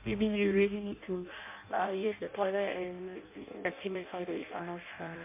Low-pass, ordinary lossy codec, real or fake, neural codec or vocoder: 3.6 kHz; MP3, 24 kbps; fake; codec, 16 kHz in and 24 kHz out, 0.6 kbps, FireRedTTS-2 codec